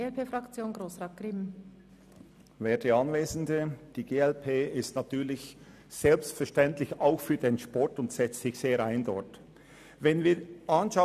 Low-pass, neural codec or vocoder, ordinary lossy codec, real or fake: 14.4 kHz; none; none; real